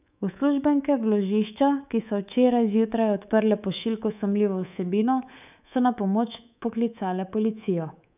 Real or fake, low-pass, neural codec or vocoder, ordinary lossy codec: fake; 3.6 kHz; codec, 24 kHz, 3.1 kbps, DualCodec; none